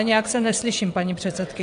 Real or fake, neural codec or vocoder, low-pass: fake; vocoder, 22.05 kHz, 80 mel bands, WaveNeXt; 9.9 kHz